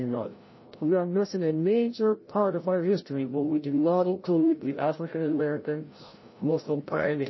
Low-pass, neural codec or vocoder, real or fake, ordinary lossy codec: 7.2 kHz; codec, 16 kHz, 0.5 kbps, FreqCodec, larger model; fake; MP3, 24 kbps